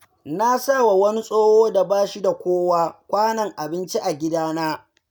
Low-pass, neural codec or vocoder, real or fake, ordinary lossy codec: none; none; real; none